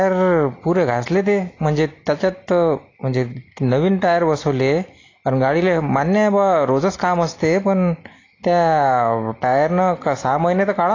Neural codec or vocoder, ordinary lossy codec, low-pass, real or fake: none; AAC, 32 kbps; 7.2 kHz; real